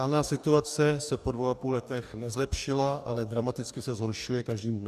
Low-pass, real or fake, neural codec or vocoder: 14.4 kHz; fake; codec, 44.1 kHz, 2.6 kbps, DAC